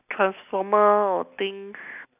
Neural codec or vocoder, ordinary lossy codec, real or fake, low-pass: none; none; real; 3.6 kHz